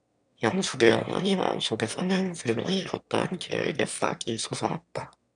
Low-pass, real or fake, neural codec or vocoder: 9.9 kHz; fake; autoencoder, 22.05 kHz, a latent of 192 numbers a frame, VITS, trained on one speaker